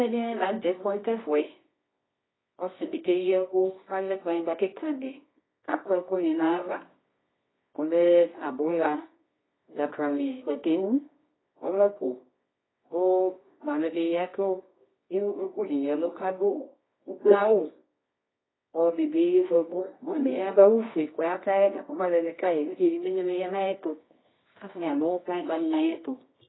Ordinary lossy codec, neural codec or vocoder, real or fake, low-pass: AAC, 16 kbps; codec, 24 kHz, 0.9 kbps, WavTokenizer, medium music audio release; fake; 7.2 kHz